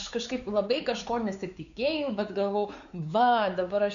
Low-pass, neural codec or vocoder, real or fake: 7.2 kHz; codec, 16 kHz, 4 kbps, X-Codec, WavLM features, trained on Multilingual LibriSpeech; fake